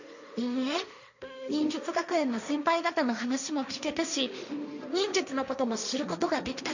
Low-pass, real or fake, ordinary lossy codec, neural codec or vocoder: 7.2 kHz; fake; none; codec, 16 kHz, 1.1 kbps, Voila-Tokenizer